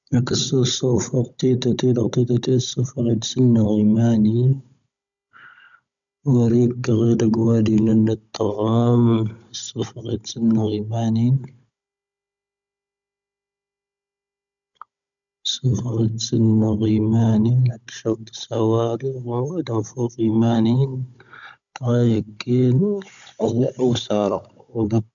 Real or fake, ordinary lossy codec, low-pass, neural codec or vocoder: fake; none; 7.2 kHz; codec, 16 kHz, 16 kbps, FunCodec, trained on Chinese and English, 50 frames a second